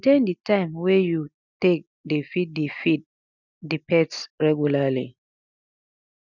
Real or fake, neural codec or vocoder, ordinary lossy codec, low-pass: real; none; none; 7.2 kHz